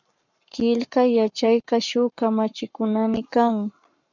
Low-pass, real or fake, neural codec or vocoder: 7.2 kHz; fake; codec, 44.1 kHz, 7.8 kbps, Pupu-Codec